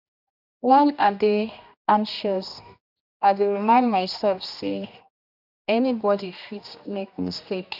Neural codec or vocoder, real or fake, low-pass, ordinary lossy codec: codec, 16 kHz, 1 kbps, X-Codec, HuBERT features, trained on general audio; fake; 5.4 kHz; none